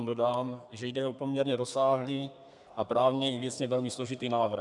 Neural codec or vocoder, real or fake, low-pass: codec, 32 kHz, 1.9 kbps, SNAC; fake; 10.8 kHz